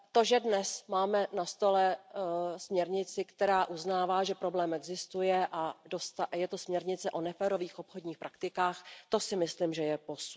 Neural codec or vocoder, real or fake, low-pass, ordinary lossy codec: none; real; none; none